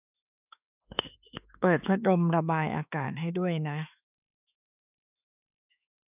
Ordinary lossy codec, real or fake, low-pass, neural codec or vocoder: none; fake; 3.6 kHz; autoencoder, 48 kHz, 32 numbers a frame, DAC-VAE, trained on Japanese speech